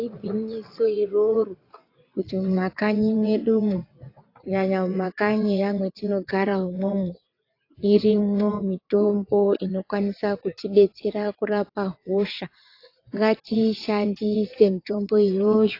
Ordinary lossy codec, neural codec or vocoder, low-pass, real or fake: AAC, 32 kbps; vocoder, 22.05 kHz, 80 mel bands, WaveNeXt; 5.4 kHz; fake